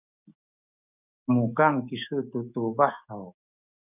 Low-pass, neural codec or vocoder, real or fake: 3.6 kHz; codec, 16 kHz, 6 kbps, DAC; fake